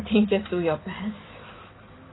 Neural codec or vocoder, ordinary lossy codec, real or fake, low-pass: vocoder, 44.1 kHz, 128 mel bands every 256 samples, BigVGAN v2; AAC, 16 kbps; fake; 7.2 kHz